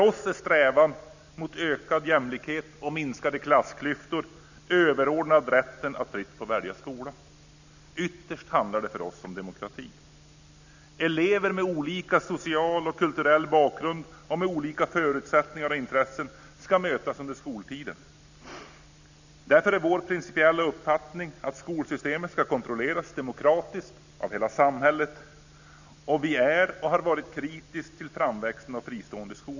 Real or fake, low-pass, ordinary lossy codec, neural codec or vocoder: real; 7.2 kHz; none; none